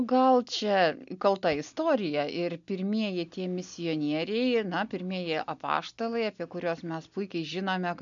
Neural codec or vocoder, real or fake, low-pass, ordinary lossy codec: none; real; 7.2 kHz; MP3, 96 kbps